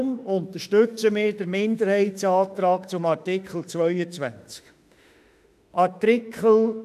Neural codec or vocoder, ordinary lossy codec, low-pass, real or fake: autoencoder, 48 kHz, 32 numbers a frame, DAC-VAE, trained on Japanese speech; none; 14.4 kHz; fake